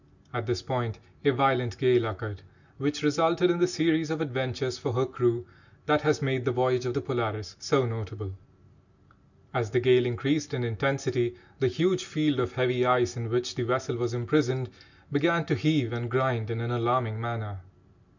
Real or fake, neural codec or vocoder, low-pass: real; none; 7.2 kHz